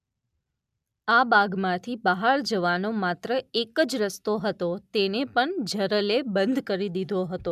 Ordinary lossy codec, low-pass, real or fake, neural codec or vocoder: none; 14.4 kHz; real; none